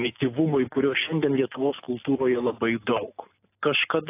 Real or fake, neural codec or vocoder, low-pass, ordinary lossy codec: real; none; 3.6 kHz; AAC, 24 kbps